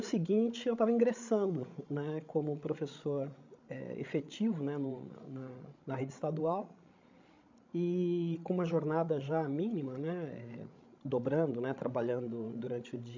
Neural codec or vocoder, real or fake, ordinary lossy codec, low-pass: codec, 16 kHz, 16 kbps, FreqCodec, larger model; fake; MP3, 64 kbps; 7.2 kHz